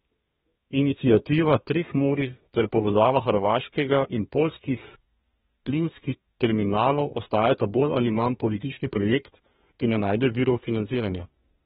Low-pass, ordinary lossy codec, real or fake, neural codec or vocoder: 14.4 kHz; AAC, 16 kbps; fake; codec, 32 kHz, 1.9 kbps, SNAC